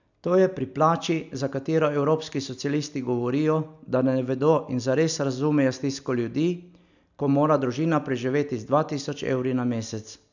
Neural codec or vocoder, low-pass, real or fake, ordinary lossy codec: none; 7.2 kHz; real; none